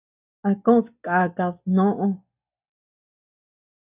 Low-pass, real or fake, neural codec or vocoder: 3.6 kHz; real; none